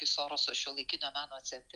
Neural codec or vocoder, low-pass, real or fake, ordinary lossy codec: none; 10.8 kHz; real; Opus, 24 kbps